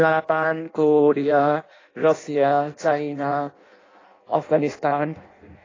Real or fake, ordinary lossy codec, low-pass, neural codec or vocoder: fake; AAC, 32 kbps; 7.2 kHz; codec, 16 kHz in and 24 kHz out, 0.6 kbps, FireRedTTS-2 codec